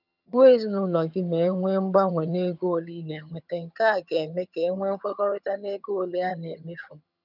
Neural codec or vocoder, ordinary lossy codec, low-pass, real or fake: vocoder, 22.05 kHz, 80 mel bands, HiFi-GAN; none; 5.4 kHz; fake